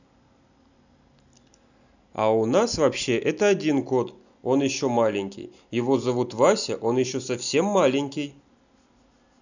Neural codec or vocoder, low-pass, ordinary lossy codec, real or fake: none; 7.2 kHz; none; real